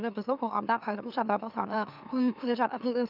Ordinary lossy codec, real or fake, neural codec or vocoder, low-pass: none; fake; autoencoder, 44.1 kHz, a latent of 192 numbers a frame, MeloTTS; 5.4 kHz